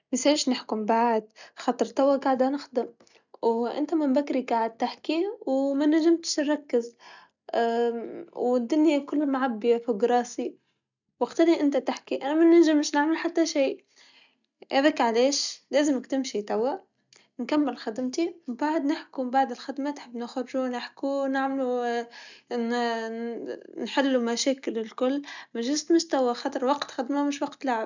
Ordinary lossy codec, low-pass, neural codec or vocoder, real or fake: none; 7.2 kHz; none; real